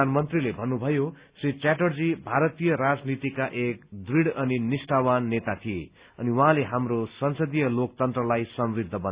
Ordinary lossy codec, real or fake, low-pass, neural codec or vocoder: Opus, 64 kbps; real; 3.6 kHz; none